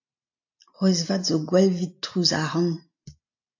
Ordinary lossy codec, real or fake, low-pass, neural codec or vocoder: AAC, 48 kbps; real; 7.2 kHz; none